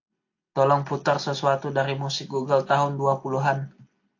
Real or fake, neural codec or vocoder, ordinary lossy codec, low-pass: real; none; AAC, 48 kbps; 7.2 kHz